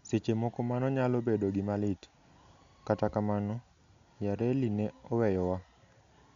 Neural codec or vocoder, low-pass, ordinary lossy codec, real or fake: none; 7.2 kHz; MP3, 64 kbps; real